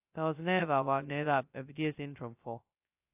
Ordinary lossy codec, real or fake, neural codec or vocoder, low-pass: AAC, 32 kbps; fake; codec, 16 kHz, 0.2 kbps, FocalCodec; 3.6 kHz